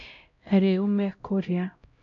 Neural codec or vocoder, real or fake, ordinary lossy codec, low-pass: codec, 16 kHz, 0.5 kbps, X-Codec, HuBERT features, trained on LibriSpeech; fake; none; 7.2 kHz